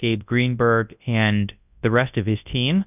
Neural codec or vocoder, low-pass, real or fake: codec, 24 kHz, 0.9 kbps, WavTokenizer, large speech release; 3.6 kHz; fake